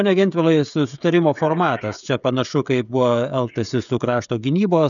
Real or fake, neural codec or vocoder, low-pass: fake; codec, 16 kHz, 16 kbps, FreqCodec, smaller model; 7.2 kHz